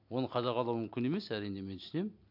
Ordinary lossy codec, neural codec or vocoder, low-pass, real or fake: MP3, 48 kbps; none; 5.4 kHz; real